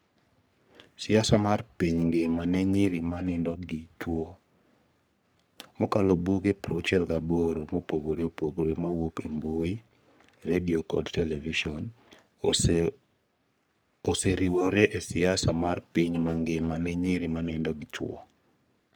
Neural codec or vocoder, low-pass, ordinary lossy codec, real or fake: codec, 44.1 kHz, 3.4 kbps, Pupu-Codec; none; none; fake